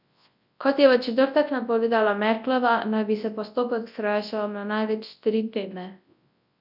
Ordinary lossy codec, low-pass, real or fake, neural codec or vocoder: none; 5.4 kHz; fake; codec, 24 kHz, 0.9 kbps, WavTokenizer, large speech release